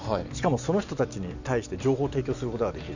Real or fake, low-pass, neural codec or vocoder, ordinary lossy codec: real; 7.2 kHz; none; none